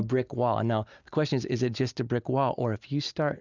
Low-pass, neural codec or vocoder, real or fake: 7.2 kHz; none; real